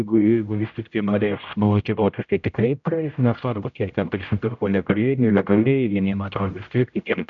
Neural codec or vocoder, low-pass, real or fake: codec, 16 kHz, 0.5 kbps, X-Codec, HuBERT features, trained on general audio; 7.2 kHz; fake